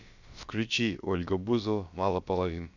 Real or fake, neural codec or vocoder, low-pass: fake; codec, 16 kHz, about 1 kbps, DyCAST, with the encoder's durations; 7.2 kHz